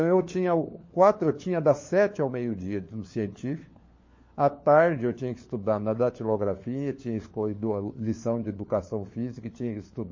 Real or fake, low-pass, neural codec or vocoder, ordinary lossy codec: fake; 7.2 kHz; codec, 16 kHz, 4 kbps, FunCodec, trained on LibriTTS, 50 frames a second; MP3, 32 kbps